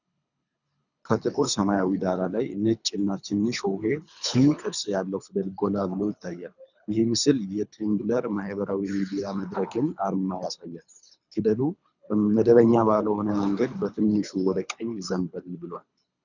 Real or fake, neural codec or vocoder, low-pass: fake; codec, 24 kHz, 3 kbps, HILCodec; 7.2 kHz